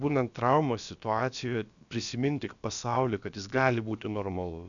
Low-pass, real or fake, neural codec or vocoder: 7.2 kHz; fake; codec, 16 kHz, about 1 kbps, DyCAST, with the encoder's durations